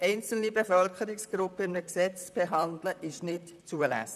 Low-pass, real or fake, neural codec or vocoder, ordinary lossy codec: 14.4 kHz; fake; vocoder, 44.1 kHz, 128 mel bands, Pupu-Vocoder; none